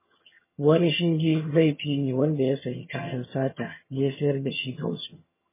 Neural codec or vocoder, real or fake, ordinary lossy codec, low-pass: vocoder, 22.05 kHz, 80 mel bands, HiFi-GAN; fake; MP3, 16 kbps; 3.6 kHz